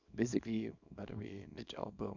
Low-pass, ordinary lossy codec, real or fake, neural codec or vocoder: 7.2 kHz; none; fake; codec, 24 kHz, 0.9 kbps, WavTokenizer, small release